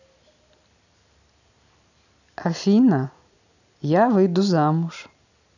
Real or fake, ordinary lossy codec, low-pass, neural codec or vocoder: real; none; 7.2 kHz; none